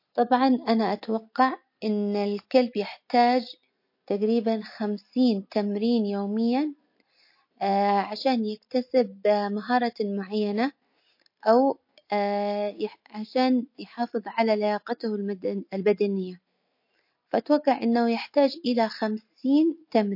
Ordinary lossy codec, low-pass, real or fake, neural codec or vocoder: MP3, 32 kbps; 5.4 kHz; real; none